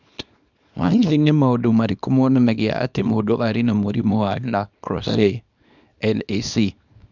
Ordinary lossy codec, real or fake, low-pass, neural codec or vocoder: none; fake; 7.2 kHz; codec, 24 kHz, 0.9 kbps, WavTokenizer, small release